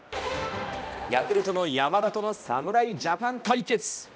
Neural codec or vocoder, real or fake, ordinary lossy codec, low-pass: codec, 16 kHz, 1 kbps, X-Codec, HuBERT features, trained on balanced general audio; fake; none; none